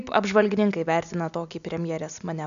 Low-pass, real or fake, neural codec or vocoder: 7.2 kHz; real; none